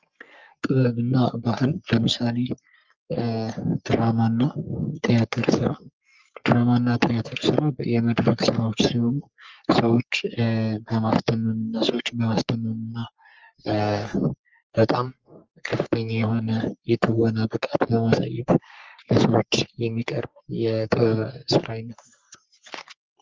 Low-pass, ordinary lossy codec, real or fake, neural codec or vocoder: 7.2 kHz; Opus, 24 kbps; fake; codec, 44.1 kHz, 3.4 kbps, Pupu-Codec